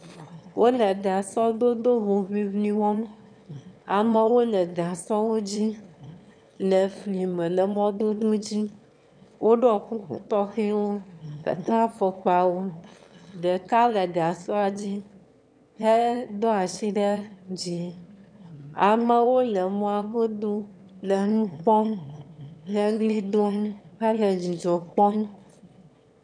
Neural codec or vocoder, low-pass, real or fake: autoencoder, 22.05 kHz, a latent of 192 numbers a frame, VITS, trained on one speaker; 9.9 kHz; fake